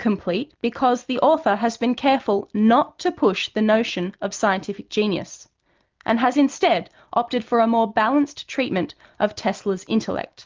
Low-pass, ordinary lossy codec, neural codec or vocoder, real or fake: 7.2 kHz; Opus, 32 kbps; none; real